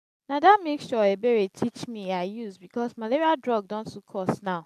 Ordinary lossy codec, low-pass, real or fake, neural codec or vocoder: MP3, 96 kbps; 14.4 kHz; real; none